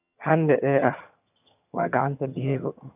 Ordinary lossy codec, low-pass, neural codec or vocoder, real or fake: none; 3.6 kHz; vocoder, 22.05 kHz, 80 mel bands, HiFi-GAN; fake